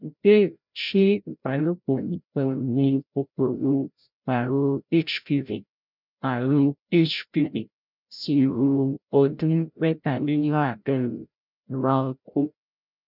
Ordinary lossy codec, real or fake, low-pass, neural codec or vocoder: none; fake; 5.4 kHz; codec, 16 kHz, 0.5 kbps, FreqCodec, larger model